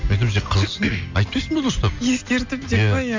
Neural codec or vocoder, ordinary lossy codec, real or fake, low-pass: none; MP3, 48 kbps; real; 7.2 kHz